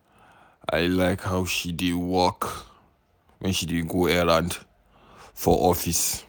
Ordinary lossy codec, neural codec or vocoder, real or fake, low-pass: none; none; real; none